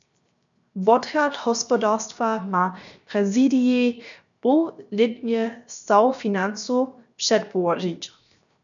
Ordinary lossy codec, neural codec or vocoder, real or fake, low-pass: MP3, 96 kbps; codec, 16 kHz, 0.7 kbps, FocalCodec; fake; 7.2 kHz